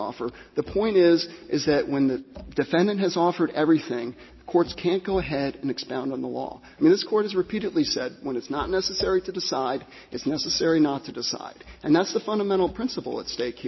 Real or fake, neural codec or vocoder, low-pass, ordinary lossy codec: real; none; 7.2 kHz; MP3, 24 kbps